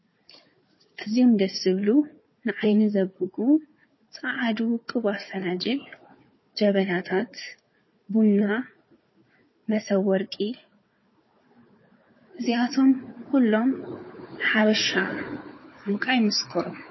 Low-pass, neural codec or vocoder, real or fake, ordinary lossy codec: 7.2 kHz; codec, 16 kHz, 4 kbps, FunCodec, trained on Chinese and English, 50 frames a second; fake; MP3, 24 kbps